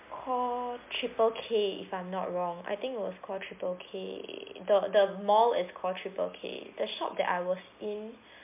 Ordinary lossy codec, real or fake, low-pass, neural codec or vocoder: MP3, 32 kbps; real; 3.6 kHz; none